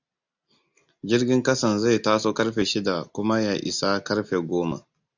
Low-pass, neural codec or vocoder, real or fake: 7.2 kHz; none; real